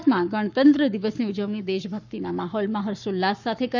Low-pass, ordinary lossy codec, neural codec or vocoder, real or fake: 7.2 kHz; none; codec, 44.1 kHz, 7.8 kbps, Pupu-Codec; fake